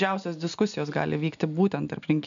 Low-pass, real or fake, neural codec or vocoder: 7.2 kHz; real; none